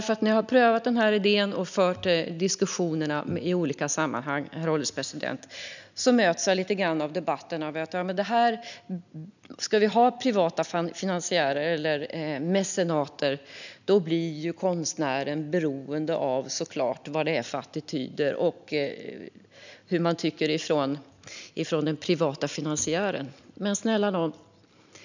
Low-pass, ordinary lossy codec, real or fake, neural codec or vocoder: 7.2 kHz; none; real; none